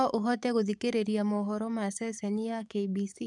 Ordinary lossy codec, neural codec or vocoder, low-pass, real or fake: none; codec, 44.1 kHz, 7.8 kbps, DAC; 10.8 kHz; fake